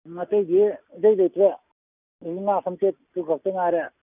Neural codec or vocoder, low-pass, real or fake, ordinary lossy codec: none; 3.6 kHz; real; none